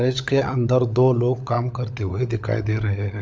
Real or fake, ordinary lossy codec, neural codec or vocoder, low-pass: fake; none; codec, 16 kHz, 16 kbps, FunCodec, trained on LibriTTS, 50 frames a second; none